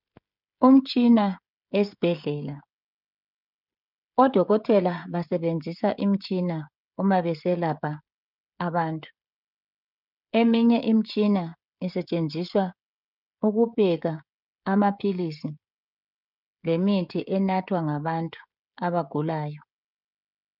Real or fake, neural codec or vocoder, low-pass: fake; codec, 16 kHz, 16 kbps, FreqCodec, smaller model; 5.4 kHz